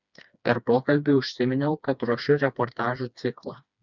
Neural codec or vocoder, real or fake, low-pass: codec, 16 kHz, 2 kbps, FreqCodec, smaller model; fake; 7.2 kHz